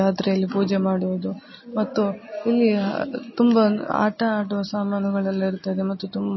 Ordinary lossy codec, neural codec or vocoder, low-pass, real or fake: MP3, 24 kbps; none; 7.2 kHz; real